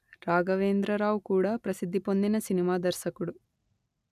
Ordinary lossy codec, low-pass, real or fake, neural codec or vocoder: none; 14.4 kHz; real; none